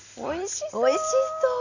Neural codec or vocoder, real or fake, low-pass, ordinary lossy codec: none; real; 7.2 kHz; none